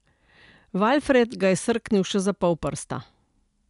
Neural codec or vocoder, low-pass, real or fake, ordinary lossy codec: none; 10.8 kHz; real; none